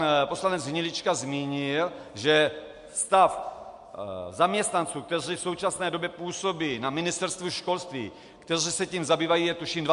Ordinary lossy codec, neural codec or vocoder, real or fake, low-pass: MP3, 64 kbps; none; real; 10.8 kHz